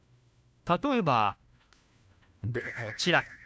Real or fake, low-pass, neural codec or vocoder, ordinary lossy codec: fake; none; codec, 16 kHz, 1 kbps, FunCodec, trained on LibriTTS, 50 frames a second; none